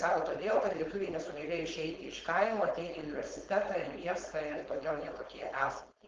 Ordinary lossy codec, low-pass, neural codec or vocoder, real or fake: Opus, 16 kbps; 7.2 kHz; codec, 16 kHz, 4.8 kbps, FACodec; fake